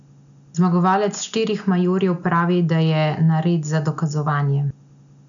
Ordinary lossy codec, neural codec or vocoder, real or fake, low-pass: none; none; real; 7.2 kHz